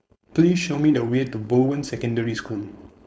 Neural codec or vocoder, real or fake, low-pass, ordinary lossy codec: codec, 16 kHz, 4.8 kbps, FACodec; fake; none; none